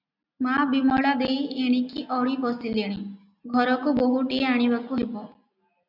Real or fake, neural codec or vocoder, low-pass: real; none; 5.4 kHz